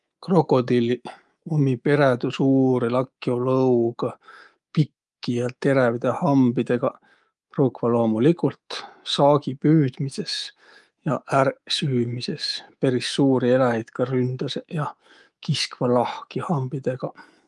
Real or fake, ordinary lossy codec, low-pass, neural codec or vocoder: fake; Opus, 32 kbps; 10.8 kHz; codec, 24 kHz, 3.1 kbps, DualCodec